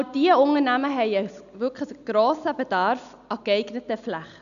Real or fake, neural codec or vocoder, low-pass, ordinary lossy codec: real; none; 7.2 kHz; none